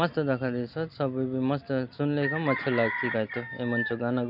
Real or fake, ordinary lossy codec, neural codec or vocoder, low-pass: real; MP3, 48 kbps; none; 5.4 kHz